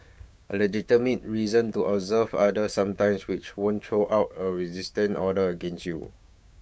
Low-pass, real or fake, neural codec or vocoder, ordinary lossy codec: none; fake; codec, 16 kHz, 6 kbps, DAC; none